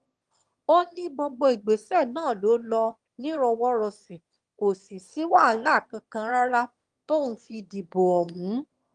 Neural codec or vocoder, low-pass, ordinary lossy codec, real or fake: autoencoder, 22.05 kHz, a latent of 192 numbers a frame, VITS, trained on one speaker; 9.9 kHz; Opus, 24 kbps; fake